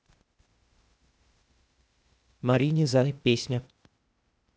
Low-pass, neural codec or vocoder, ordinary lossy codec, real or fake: none; codec, 16 kHz, 0.8 kbps, ZipCodec; none; fake